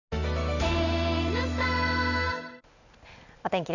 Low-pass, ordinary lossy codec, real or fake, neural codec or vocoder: 7.2 kHz; none; real; none